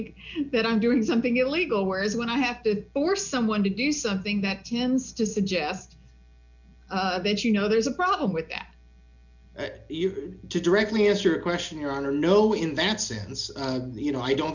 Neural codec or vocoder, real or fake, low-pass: none; real; 7.2 kHz